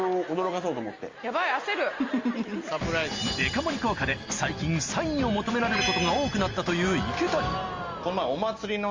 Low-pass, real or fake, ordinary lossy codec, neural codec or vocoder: 7.2 kHz; real; Opus, 32 kbps; none